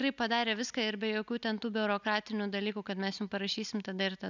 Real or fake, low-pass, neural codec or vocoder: real; 7.2 kHz; none